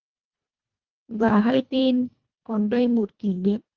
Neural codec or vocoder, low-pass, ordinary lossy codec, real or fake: codec, 24 kHz, 1.5 kbps, HILCodec; 7.2 kHz; Opus, 32 kbps; fake